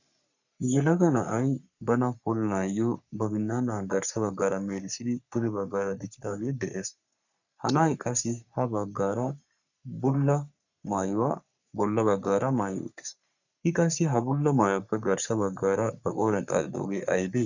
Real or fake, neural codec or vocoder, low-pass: fake; codec, 44.1 kHz, 3.4 kbps, Pupu-Codec; 7.2 kHz